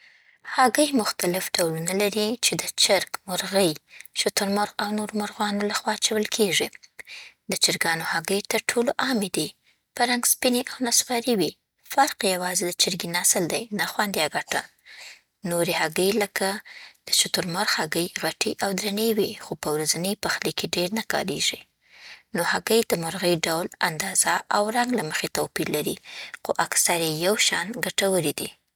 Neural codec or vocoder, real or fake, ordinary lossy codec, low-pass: none; real; none; none